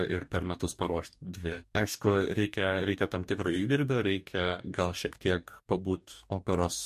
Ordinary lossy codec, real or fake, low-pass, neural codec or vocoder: MP3, 64 kbps; fake; 14.4 kHz; codec, 44.1 kHz, 2.6 kbps, DAC